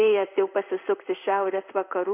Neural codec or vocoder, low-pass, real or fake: codec, 16 kHz in and 24 kHz out, 1 kbps, XY-Tokenizer; 3.6 kHz; fake